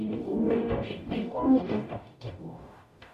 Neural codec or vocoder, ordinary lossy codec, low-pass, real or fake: codec, 44.1 kHz, 0.9 kbps, DAC; MP3, 64 kbps; 14.4 kHz; fake